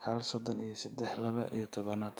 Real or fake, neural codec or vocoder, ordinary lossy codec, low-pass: fake; codec, 44.1 kHz, 7.8 kbps, DAC; none; none